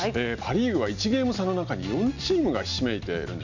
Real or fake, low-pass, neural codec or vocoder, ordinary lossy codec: real; 7.2 kHz; none; none